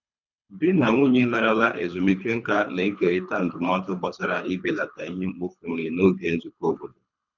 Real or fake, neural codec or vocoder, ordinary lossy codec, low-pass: fake; codec, 24 kHz, 3 kbps, HILCodec; none; 7.2 kHz